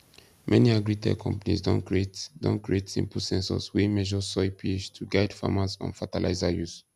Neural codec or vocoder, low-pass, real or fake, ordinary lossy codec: none; 14.4 kHz; real; none